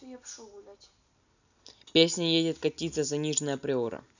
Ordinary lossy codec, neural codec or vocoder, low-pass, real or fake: none; none; 7.2 kHz; real